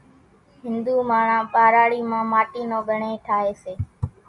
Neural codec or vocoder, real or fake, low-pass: none; real; 10.8 kHz